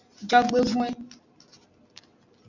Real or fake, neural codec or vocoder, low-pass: real; none; 7.2 kHz